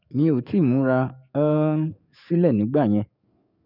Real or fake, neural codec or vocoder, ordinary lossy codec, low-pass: fake; codec, 44.1 kHz, 7.8 kbps, DAC; none; 5.4 kHz